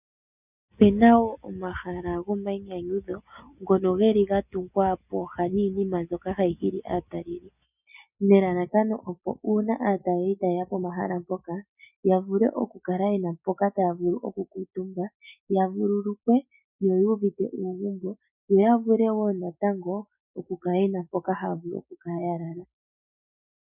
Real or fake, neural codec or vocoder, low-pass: real; none; 3.6 kHz